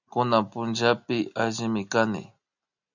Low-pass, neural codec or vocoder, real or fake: 7.2 kHz; none; real